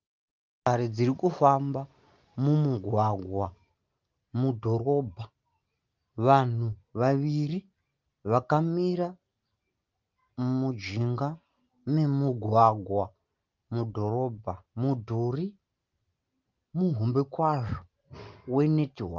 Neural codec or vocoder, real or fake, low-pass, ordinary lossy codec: none; real; 7.2 kHz; Opus, 32 kbps